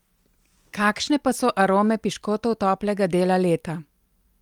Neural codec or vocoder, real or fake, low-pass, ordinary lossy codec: none; real; 19.8 kHz; Opus, 32 kbps